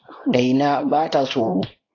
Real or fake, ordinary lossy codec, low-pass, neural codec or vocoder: fake; AAC, 32 kbps; 7.2 kHz; codec, 24 kHz, 0.9 kbps, WavTokenizer, small release